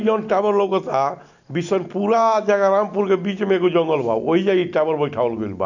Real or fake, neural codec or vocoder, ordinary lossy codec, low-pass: real; none; none; 7.2 kHz